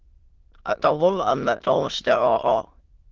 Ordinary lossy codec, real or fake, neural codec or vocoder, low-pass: Opus, 16 kbps; fake; autoencoder, 22.05 kHz, a latent of 192 numbers a frame, VITS, trained on many speakers; 7.2 kHz